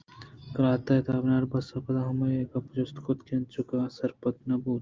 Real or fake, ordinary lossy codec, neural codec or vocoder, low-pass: real; Opus, 32 kbps; none; 7.2 kHz